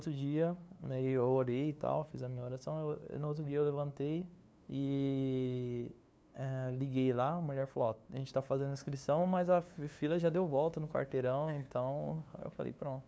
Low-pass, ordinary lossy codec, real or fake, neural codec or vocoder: none; none; fake; codec, 16 kHz, 2 kbps, FunCodec, trained on LibriTTS, 25 frames a second